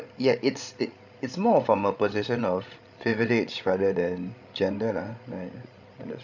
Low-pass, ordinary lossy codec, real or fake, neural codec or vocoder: 7.2 kHz; none; fake; codec, 16 kHz, 16 kbps, FreqCodec, larger model